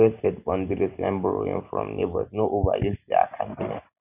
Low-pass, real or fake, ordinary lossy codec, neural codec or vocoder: 3.6 kHz; real; none; none